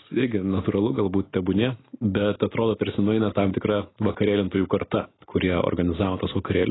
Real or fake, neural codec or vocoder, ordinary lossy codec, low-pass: real; none; AAC, 16 kbps; 7.2 kHz